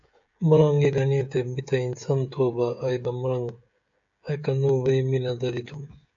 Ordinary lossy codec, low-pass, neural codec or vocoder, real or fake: AAC, 64 kbps; 7.2 kHz; codec, 16 kHz, 16 kbps, FreqCodec, smaller model; fake